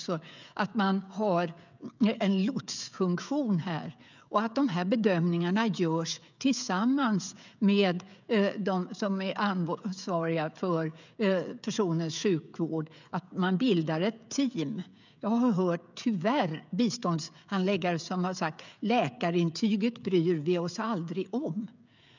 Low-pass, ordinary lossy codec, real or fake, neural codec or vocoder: 7.2 kHz; none; fake; codec, 16 kHz, 16 kbps, FreqCodec, smaller model